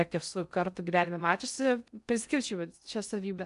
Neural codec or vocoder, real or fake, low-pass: codec, 16 kHz in and 24 kHz out, 0.6 kbps, FocalCodec, streaming, 2048 codes; fake; 10.8 kHz